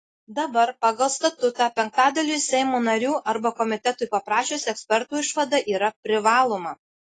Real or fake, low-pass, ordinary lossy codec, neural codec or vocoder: real; 10.8 kHz; AAC, 32 kbps; none